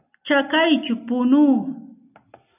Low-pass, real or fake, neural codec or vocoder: 3.6 kHz; real; none